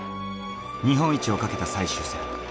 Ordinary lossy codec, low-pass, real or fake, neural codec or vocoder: none; none; real; none